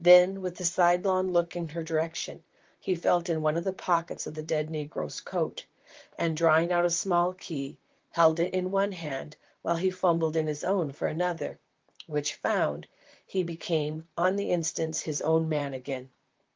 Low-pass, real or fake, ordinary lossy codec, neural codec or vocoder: 7.2 kHz; fake; Opus, 32 kbps; vocoder, 44.1 kHz, 128 mel bands, Pupu-Vocoder